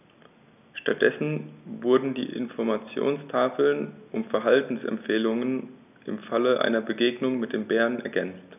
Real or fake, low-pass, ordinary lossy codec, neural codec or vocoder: real; 3.6 kHz; none; none